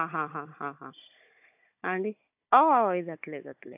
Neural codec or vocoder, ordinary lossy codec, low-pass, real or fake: codec, 16 kHz, 16 kbps, FunCodec, trained on Chinese and English, 50 frames a second; AAC, 32 kbps; 3.6 kHz; fake